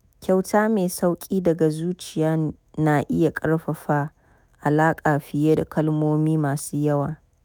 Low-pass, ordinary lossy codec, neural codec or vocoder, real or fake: none; none; autoencoder, 48 kHz, 128 numbers a frame, DAC-VAE, trained on Japanese speech; fake